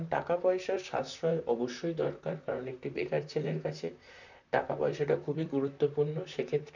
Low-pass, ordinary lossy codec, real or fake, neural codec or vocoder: 7.2 kHz; none; fake; vocoder, 44.1 kHz, 128 mel bands, Pupu-Vocoder